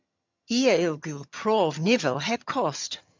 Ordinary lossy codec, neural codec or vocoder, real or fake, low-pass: MP3, 64 kbps; vocoder, 22.05 kHz, 80 mel bands, HiFi-GAN; fake; 7.2 kHz